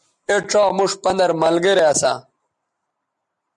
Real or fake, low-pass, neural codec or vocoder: real; 10.8 kHz; none